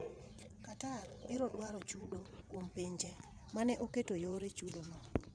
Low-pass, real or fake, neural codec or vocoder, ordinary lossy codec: 9.9 kHz; fake; vocoder, 22.05 kHz, 80 mel bands, Vocos; none